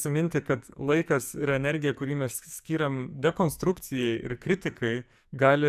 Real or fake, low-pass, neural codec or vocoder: fake; 14.4 kHz; codec, 44.1 kHz, 2.6 kbps, SNAC